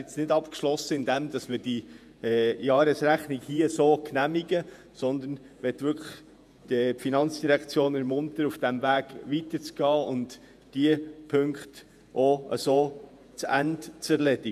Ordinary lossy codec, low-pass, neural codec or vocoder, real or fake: none; 14.4 kHz; vocoder, 48 kHz, 128 mel bands, Vocos; fake